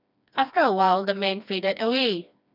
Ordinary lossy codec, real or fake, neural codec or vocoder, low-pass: none; fake; codec, 16 kHz, 2 kbps, FreqCodec, smaller model; 5.4 kHz